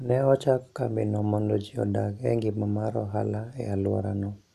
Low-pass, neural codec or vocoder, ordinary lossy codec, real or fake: 14.4 kHz; none; none; real